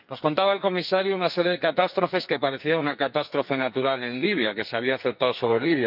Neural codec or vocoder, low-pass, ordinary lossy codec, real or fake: codec, 44.1 kHz, 2.6 kbps, SNAC; 5.4 kHz; none; fake